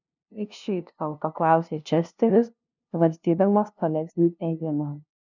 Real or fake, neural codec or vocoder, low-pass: fake; codec, 16 kHz, 0.5 kbps, FunCodec, trained on LibriTTS, 25 frames a second; 7.2 kHz